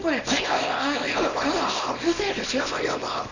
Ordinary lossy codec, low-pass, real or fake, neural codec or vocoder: none; 7.2 kHz; fake; codec, 24 kHz, 0.9 kbps, WavTokenizer, small release